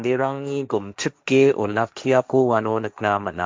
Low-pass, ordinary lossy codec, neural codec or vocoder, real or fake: none; none; codec, 16 kHz, 1.1 kbps, Voila-Tokenizer; fake